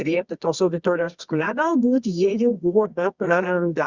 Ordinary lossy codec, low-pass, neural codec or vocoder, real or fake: Opus, 64 kbps; 7.2 kHz; codec, 24 kHz, 0.9 kbps, WavTokenizer, medium music audio release; fake